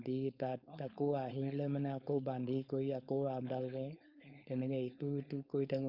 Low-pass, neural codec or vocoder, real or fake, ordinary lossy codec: 5.4 kHz; codec, 16 kHz, 4.8 kbps, FACodec; fake; none